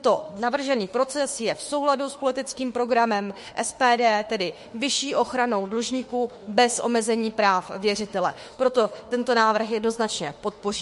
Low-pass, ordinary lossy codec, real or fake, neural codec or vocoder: 14.4 kHz; MP3, 48 kbps; fake; autoencoder, 48 kHz, 32 numbers a frame, DAC-VAE, trained on Japanese speech